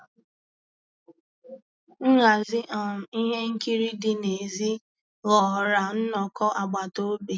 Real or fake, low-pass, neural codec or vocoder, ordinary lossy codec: real; none; none; none